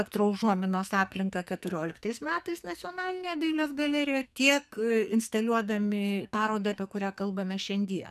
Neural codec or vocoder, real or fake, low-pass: codec, 44.1 kHz, 2.6 kbps, SNAC; fake; 14.4 kHz